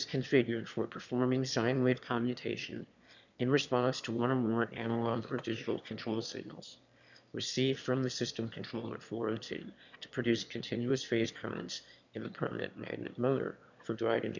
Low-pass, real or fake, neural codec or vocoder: 7.2 kHz; fake; autoencoder, 22.05 kHz, a latent of 192 numbers a frame, VITS, trained on one speaker